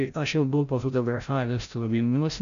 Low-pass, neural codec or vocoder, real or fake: 7.2 kHz; codec, 16 kHz, 0.5 kbps, FreqCodec, larger model; fake